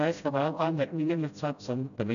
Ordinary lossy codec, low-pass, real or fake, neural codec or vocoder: none; 7.2 kHz; fake; codec, 16 kHz, 0.5 kbps, FreqCodec, smaller model